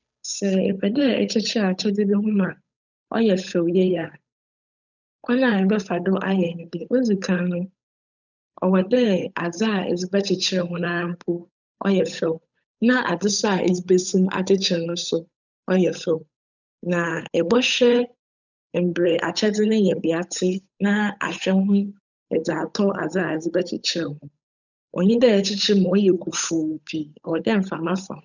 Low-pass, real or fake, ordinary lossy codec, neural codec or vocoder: 7.2 kHz; fake; none; codec, 16 kHz, 8 kbps, FunCodec, trained on Chinese and English, 25 frames a second